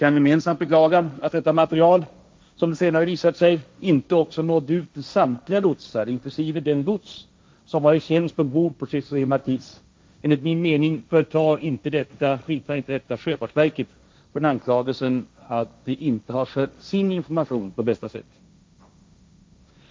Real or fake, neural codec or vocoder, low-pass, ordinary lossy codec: fake; codec, 16 kHz, 1.1 kbps, Voila-Tokenizer; none; none